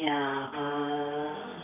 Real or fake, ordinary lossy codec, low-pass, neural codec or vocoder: fake; AAC, 24 kbps; 3.6 kHz; codec, 24 kHz, 0.9 kbps, WavTokenizer, medium music audio release